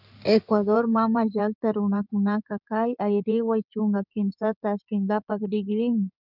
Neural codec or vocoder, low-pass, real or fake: codec, 16 kHz in and 24 kHz out, 2.2 kbps, FireRedTTS-2 codec; 5.4 kHz; fake